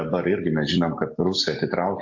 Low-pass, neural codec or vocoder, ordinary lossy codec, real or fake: 7.2 kHz; none; AAC, 48 kbps; real